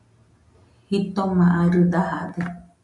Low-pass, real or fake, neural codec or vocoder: 10.8 kHz; real; none